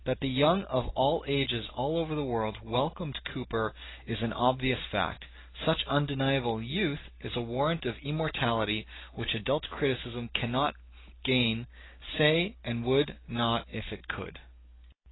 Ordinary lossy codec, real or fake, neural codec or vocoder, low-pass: AAC, 16 kbps; real; none; 7.2 kHz